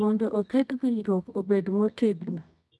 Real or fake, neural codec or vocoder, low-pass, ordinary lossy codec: fake; codec, 24 kHz, 0.9 kbps, WavTokenizer, medium music audio release; none; none